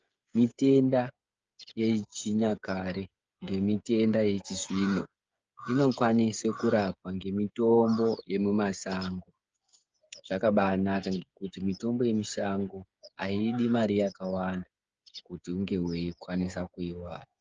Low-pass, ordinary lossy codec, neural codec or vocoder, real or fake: 7.2 kHz; Opus, 24 kbps; codec, 16 kHz, 8 kbps, FreqCodec, smaller model; fake